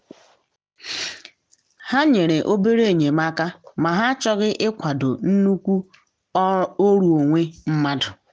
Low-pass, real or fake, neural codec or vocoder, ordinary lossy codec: none; real; none; none